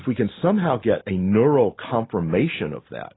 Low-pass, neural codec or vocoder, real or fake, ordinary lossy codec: 7.2 kHz; none; real; AAC, 16 kbps